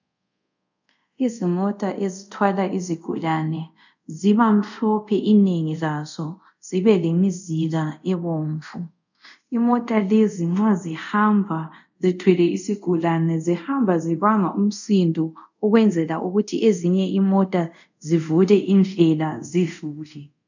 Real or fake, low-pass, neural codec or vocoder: fake; 7.2 kHz; codec, 24 kHz, 0.5 kbps, DualCodec